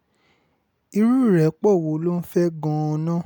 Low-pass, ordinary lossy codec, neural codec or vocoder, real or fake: none; none; none; real